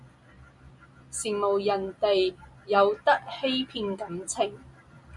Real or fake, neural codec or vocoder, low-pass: real; none; 10.8 kHz